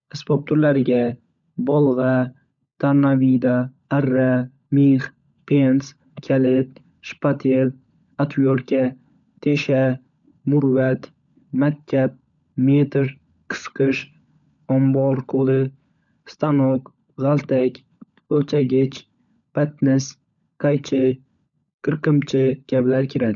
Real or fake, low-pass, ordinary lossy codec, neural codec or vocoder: fake; 7.2 kHz; none; codec, 16 kHz, 16 kbps, FunCodec, trained on LibriTTS, 50 frames a second